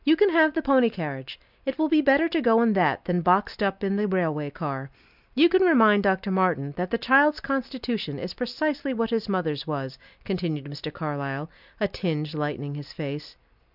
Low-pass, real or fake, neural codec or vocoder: 5.4 kHz; real; none